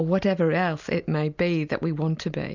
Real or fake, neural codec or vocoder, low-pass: real; none; 7.2 kHz